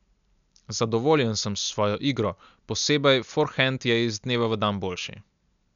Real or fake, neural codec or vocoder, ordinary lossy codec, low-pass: real; none; none; 7.2 kHz